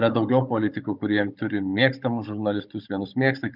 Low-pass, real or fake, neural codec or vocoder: 5.4 kHz; fake; codec, 16 kHz, 16 kbps, FunCodec, trained on Chinese and English, 50 frames a second